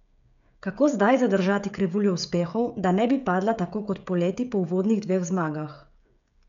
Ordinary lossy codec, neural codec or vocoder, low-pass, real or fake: none; codec, 16 kHz, 16 kbps, FreqCodec, smaller model; 7.2 kHz; fake